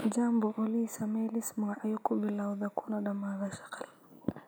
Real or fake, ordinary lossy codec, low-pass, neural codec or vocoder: real; none; none; none